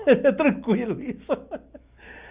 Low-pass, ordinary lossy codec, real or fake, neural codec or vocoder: 3.6 kHz; Opus, 64 kbps; real; none